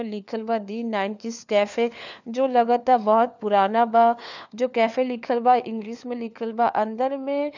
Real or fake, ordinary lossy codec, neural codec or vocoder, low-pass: fake; none; codec, 16 kHz, 4 kbps, FunCodec, trained on LibriTTS, 50 frames a second; 7.2 kHz